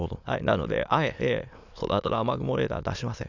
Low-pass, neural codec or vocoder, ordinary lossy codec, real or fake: 7.2 kHz; autoencoder, 22.05 kHz, a latent of 192 numbers a frame, VITS, trained on many speakers; none; fake